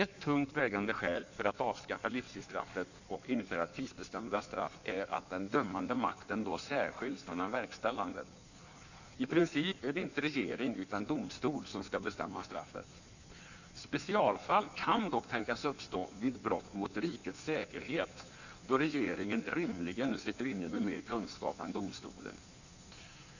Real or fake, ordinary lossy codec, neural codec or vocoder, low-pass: fake; none; codec, 16 kHz in and 24 kHz out, 1.1 kbps, FireRedTTS-2 codec; 7.2 kHz